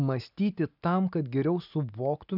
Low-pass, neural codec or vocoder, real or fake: 5.4 kHz; none; real